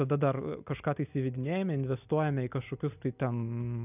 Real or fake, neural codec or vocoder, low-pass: real; none; 3.6 kHz